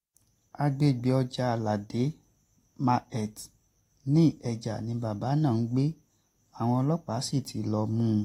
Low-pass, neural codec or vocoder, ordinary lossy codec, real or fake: 19.8 kHz; none; AAC, 48 kbps; real